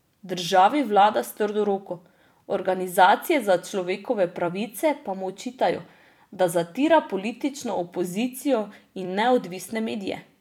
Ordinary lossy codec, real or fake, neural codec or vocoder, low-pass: none; fake; vocoder, 44.1 kHz, 128 mel bands every 256 samples, BigVGAN v2; 19.8 kHz